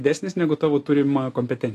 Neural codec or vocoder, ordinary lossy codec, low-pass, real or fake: none; AAC, 48 kbps; 14.4 kHz; real